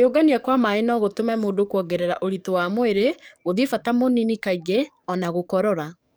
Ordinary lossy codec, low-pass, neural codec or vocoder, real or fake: none; none; codec, 44.1 kHz, 7.8 kbps, DAC; fake